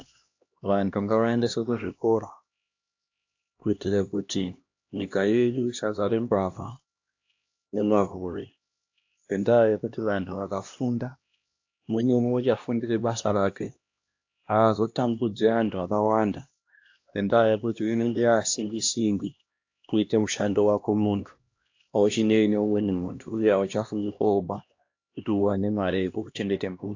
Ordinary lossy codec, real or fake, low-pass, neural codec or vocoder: AAC, 48 kbps; fake; 7.2 kHz; codec, 16 kHz, 1 kbps, X-Codec, HuBERT features, trained on LibriSpeech